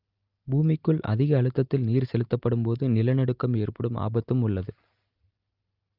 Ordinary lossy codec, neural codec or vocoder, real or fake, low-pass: Opus, 24 kbps; none; real; 5.4 kHz